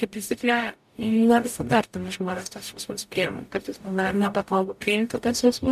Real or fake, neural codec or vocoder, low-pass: fake; codec, 44.1 kHz, 0.9 kbps, DAC; 14.4 kHz